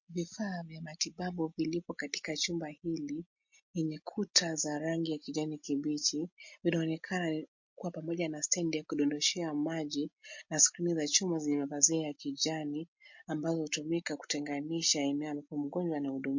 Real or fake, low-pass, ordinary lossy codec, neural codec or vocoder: real; 7.2 kHz; MP3, 48 kbps; none